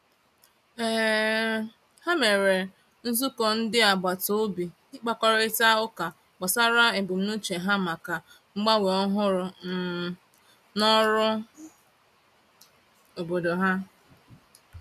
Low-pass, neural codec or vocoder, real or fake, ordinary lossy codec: 14.4 kHz; none; real; none